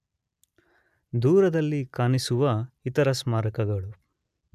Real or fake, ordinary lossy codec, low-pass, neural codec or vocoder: real; none; 14.4 kHz; none